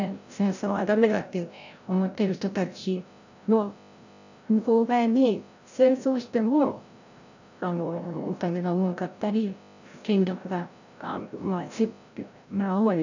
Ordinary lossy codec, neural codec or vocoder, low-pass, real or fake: none; codec, 16 kHz, 0.5 kbps, FreqCodec, larger model; 7.2 kHz; fake